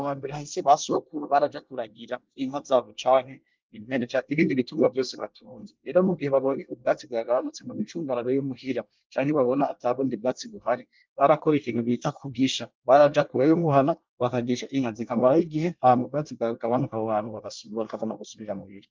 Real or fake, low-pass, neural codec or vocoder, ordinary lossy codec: fake; 7.2 kHz; codec, 24 kHz, 1 kbps, SNAC; Opus, 24 kbps